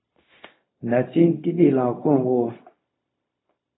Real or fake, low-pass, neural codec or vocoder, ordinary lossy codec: fake; 7.2 kHz; codec, 16 kHz, 0.4 kbps, LongCat-Audio-Codec; AAC, 16 kbps